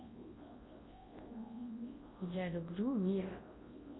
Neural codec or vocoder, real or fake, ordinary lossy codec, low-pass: codec, 24 kHz, 0.9 kbps, WavTokenizer, large speech release; fake; AAC, 16 kbps; 7.2 kHz